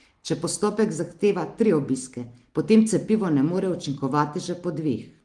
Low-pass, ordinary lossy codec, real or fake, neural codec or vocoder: 10.8 kHz; Opus, 24 kbps; real; none